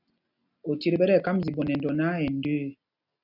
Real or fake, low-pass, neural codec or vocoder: real; 5.4 kHz; none